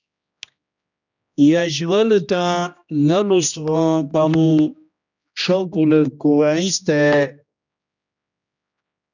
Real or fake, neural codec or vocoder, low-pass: fake; codec, 16 kHz, 1 kbps, X-Codec, HuBERT features, trained on general audio; 7.2 kHz